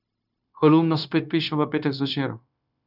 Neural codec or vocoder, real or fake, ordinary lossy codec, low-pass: codec, 16 kHz, 0.9 kbps, LongCat-Audio-Codec; fake; none; 5.4 kHz